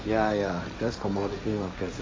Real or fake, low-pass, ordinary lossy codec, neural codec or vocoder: fake; none; none; codec, 16 kHz, 1.1 kbps, Voila-Tokenizer